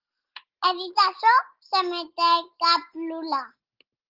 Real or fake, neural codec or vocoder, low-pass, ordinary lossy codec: real; none; 5.4 kHz; Opus, 16 kbps